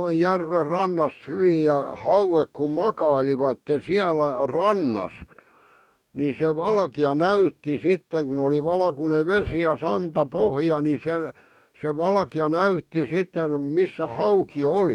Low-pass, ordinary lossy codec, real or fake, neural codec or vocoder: 19.8 kHz; none; fake; codec, 44.1 kHz, 2.6 kbps, DAC